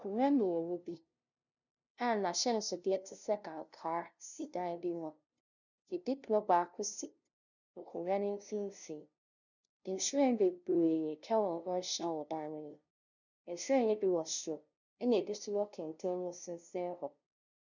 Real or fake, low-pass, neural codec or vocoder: fake; 7.2 kHz; codec, 16 kHz, 0.5 kbps, FunCodec, trained on LibriTTS, 25 frames a second